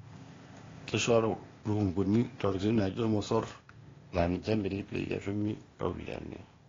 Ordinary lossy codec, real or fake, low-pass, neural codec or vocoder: AAC, 32 kbps; fake; 7.2 kHz; codec, 16 kHz, 0.8 kbps, ZipCodec